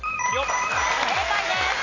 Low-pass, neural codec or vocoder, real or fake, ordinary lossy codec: 7.2 kHz; none; real; none